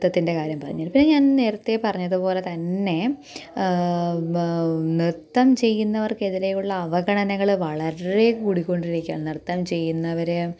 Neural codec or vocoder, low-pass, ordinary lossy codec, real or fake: none; none; none; real